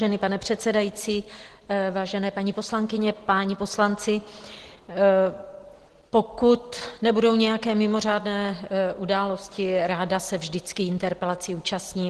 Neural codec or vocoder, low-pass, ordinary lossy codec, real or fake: none; 10.8 kHz; Opus, 16 kbps; real